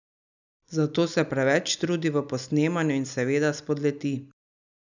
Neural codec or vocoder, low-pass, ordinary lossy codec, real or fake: none; 7.2 kHz; none; real